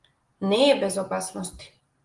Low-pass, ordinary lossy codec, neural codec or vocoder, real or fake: 10.8 kHz; Opus, 24 kbps; none; real